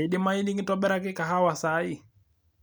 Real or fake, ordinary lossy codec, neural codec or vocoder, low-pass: real; none; none; none